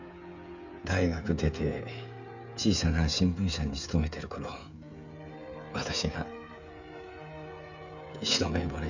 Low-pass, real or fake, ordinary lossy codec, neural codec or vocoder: 7.2 kHz; fake; none; codec, 16 kHz, 16 kbps, FreqCodec, smaller model